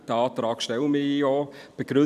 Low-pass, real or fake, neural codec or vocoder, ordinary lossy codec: 14.4 kHz; fake; vocoder, 44.1 kHz, 128 mel bands every 256 samples, BigVGAN v2; none